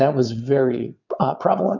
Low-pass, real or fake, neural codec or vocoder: 7.2 kHz; fake; vocoder, 22.05 kHz, 80 mel bands, WaveNeXt